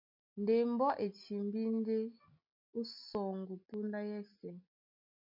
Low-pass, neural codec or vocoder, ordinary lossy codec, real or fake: 5.4 kHz; none; MP3, 48 kbps; real